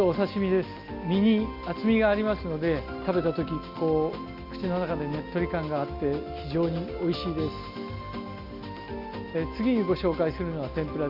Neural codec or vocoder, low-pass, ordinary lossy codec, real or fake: none; 5.4 kHz; Opus, 32 kbps; real